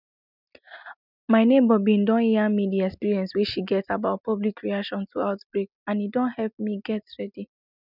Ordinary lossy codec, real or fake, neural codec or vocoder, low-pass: none; real; none; 5.4 kHz